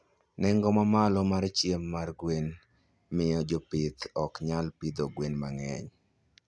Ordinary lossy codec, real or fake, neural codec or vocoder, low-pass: none; real; none; none